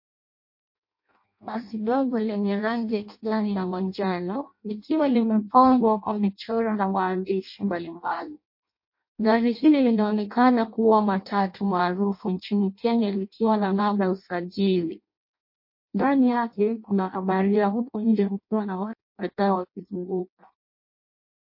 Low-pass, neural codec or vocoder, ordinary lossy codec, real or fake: 5.4 kHz; codec, 16 kHz in and 24 kHz out, 0.6 kbps, FireRedTTS-2 codec; MP3, 32 kbps; fake